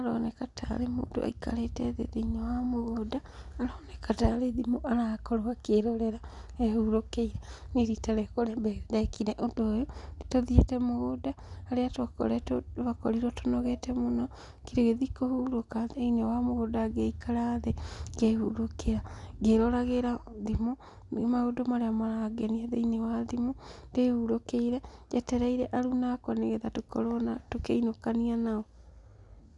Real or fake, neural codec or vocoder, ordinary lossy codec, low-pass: real; none; none; 10.8 kHz